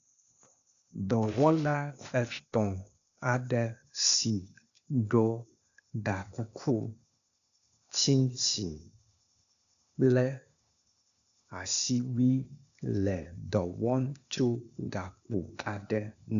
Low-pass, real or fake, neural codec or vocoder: 7.2 kHz; fake; codec, 16 kHz, 0.8 kbps, ZipCodec